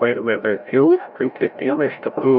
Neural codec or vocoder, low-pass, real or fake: codec, 16 kHz, 0.5 kbps, FreqCodec, larger model; 5.4 kHz; fake